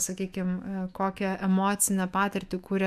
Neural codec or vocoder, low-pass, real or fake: autoencoder, 48 kHz, 128 numbers a frame, DAC-VAE, trained on Japanese speech; 14.4 kHz; fake